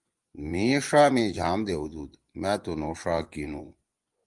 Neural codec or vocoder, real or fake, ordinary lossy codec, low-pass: vocoder, 24 kHz, 100 mel bands, Vocos; fake; Opus, 32 kbps; 10.8 kHz